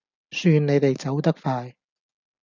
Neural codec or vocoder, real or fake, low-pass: none; real; 7.2 kHz